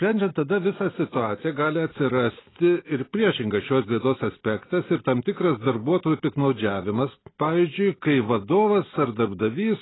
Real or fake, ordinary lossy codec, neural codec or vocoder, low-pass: real; AAC, 16 kbps; none; 7.2 kHz